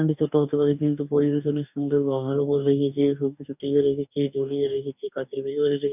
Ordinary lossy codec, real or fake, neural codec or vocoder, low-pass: none; fake; codec, 44.1 kHz, 2.6 kbps, DAC; 3.6 kHz